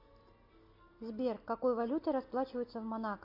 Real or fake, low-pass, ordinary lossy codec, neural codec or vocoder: real; 5.4 kHz; AAC, 48 kbps; none